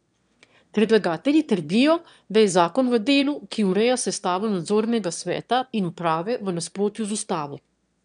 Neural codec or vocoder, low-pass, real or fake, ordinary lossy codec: autoencoder, 22.05 kHz, a latent of 192 numbers a frame, VITS, trained on one speaker; 9.9 kHz; fake; none